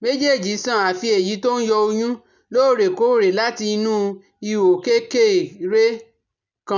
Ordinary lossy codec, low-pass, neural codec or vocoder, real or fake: none; 7.2 kHz; none; real